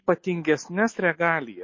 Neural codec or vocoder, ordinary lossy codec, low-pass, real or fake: none; MP3, 32 kbps; 7.2 kHz; real